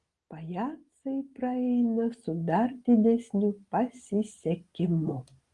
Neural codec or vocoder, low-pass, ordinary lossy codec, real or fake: none; 10.8 kHz; Opus, 24 kbps; real